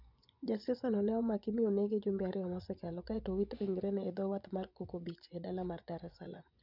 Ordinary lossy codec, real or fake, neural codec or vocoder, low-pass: none; fake; vocoder, 24 kHz, 100 mel bands, Vocos; 5.4 kHz